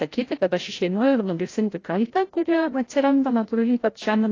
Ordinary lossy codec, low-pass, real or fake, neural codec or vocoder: AAC, 32 kbps; 7.2 kHz; fake; codec, 16 kHz, 0.5 kbps, FreqCodec, larger model